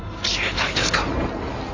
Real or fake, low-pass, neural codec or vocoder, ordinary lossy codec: fake; 7.2 kHz; codec, 16 kHz in and 24 kHz out, 2.2 kbps, FireRedTTS-2 codec; AAC, 32 kbps